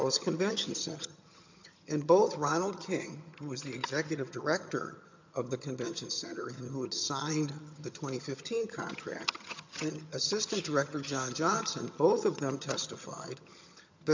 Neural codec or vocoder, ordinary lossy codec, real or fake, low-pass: vocoder, 22.05 kHz, 80 mel bands, HiFi-GAN; MP3, 64 kbps; fake; 7.2 kHz